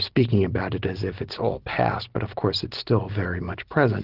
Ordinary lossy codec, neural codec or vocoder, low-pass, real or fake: Opus, 16 kbps; none; 5.4 kHz; real